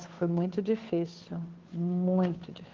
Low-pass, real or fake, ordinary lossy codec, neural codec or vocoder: 7.2 kHz; fake; Opus, 16 kbps; codec, 16 kHz, 2 kbps, FunCodec, trained on Chinese and English, 25 frames a second